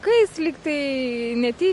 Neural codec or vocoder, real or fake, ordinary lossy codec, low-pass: none; real; MP3, 48 kbps; 14.4 kHz